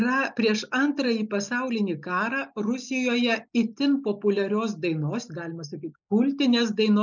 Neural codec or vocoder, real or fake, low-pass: none; real; 7.2 kHz